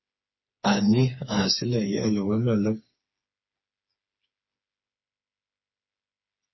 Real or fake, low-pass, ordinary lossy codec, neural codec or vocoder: fake; 7.2 kHz; MP3, 24 kbps; codec, 16 kHz, 4 kbps, FreqCodec, smaller model